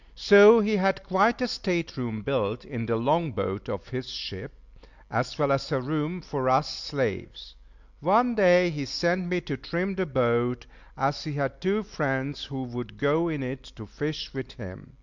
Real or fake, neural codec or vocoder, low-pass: real; none; 7.2 kHz